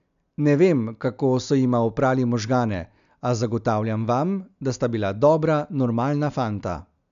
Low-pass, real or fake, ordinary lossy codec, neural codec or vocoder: 7.2 kHz; real; none; none